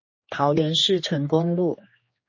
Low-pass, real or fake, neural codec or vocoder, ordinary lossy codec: 7.2 kHz; fake; codec, 16 kHz, 2 kbps, X-Codec, HuBERT features, trained on general audio; MP3, 32 kbps